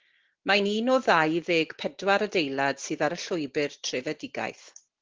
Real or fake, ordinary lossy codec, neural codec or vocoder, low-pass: real; Opus, 16 kbps; none; 7.2 kHz